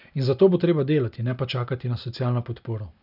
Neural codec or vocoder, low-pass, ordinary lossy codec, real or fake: none; 5.4 kHz; none; real